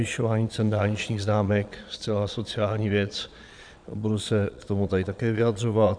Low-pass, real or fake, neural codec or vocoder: 9.9 kHz; fake; vocoder, 22.05 kHz, 80 mel bands, Vocos